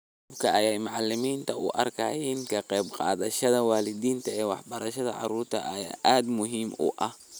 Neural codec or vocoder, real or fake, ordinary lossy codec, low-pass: none; real; none; none